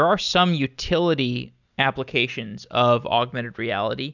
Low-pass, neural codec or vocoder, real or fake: 7.2 kHz; none; real